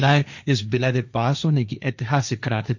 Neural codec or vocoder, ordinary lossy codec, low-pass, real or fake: codec, 16 kHz, 1.1 kbps, Voila-Tokenizer; none; 7.2 kHz; fake